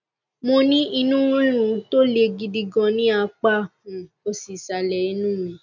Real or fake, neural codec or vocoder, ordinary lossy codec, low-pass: real; none; none; 7.2 kHz